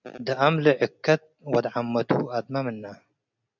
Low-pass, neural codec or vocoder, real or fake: 7.2 kHz; none; real